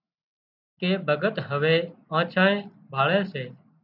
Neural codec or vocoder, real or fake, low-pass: none; real; 5.4 kHz